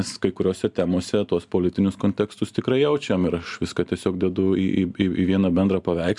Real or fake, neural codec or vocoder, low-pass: real; none; 10.8 kHz